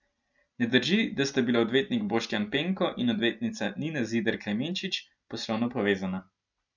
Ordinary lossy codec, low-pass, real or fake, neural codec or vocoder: none; 7.2 kHz; real; none